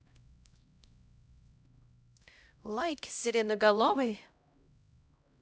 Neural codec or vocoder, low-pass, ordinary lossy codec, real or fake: codec, 16 kHz, 0.5 kbps, X-Codec, HuBERT features, trained on LibriSpeech; none; none; fake